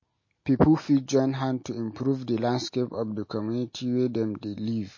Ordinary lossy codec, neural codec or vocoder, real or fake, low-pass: MP3, 32 kbps; none; real; 7.2 kHz